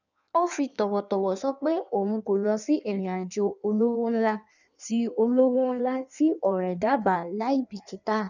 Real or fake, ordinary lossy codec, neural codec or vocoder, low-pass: fake; none; codec, 16 kHz in and 24 kHz out, 1.1 kbps, FireRedTTS-2 codec; 7.2 kHz